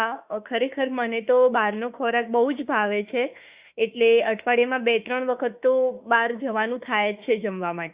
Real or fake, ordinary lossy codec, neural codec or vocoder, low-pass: fake; Opus, 64 kbps; autoencoder, 48 kHz, 32 numbers a frame, DAC-VAE, trained on Japanese speech; 3.6 kHz